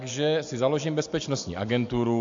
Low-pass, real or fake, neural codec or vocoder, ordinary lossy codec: 7.2 kHz; real; none; AAC, 64 kbps